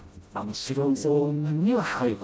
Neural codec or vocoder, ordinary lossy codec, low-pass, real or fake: codec, 16 kHz, 0.5 kbps, FreqCodec, smaller model; none; none; fake